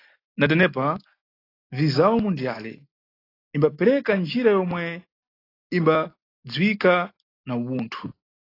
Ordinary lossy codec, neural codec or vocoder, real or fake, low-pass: AAC, 24 kbps; none; real; 5.4 kHz